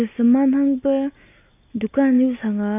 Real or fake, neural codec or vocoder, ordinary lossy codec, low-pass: real; none; AAC, 24 kbps; 3.6 kHz